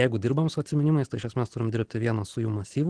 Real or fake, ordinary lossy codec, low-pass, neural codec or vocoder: real; Opus, 16 kbps; 9.9 kHz; none